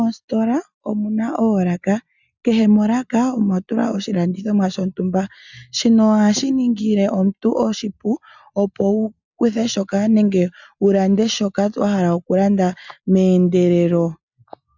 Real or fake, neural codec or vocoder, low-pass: real; none; 7.2 kHz